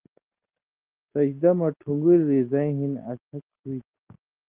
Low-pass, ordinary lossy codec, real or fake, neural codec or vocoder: 3.6 kHz; Opus, 16 kbps; real; none